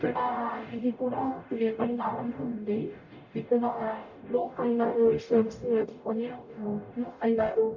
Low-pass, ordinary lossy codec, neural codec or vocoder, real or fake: 7.2 kHz; none; codec, 44.1 kHz, 0.9 kbps, DAC; fake